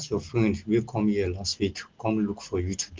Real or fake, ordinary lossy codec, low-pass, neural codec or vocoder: real; Opus, 16 kbps; 7.2 kHz; none